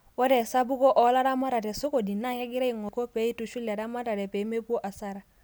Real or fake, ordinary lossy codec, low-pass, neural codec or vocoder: real; none; none; none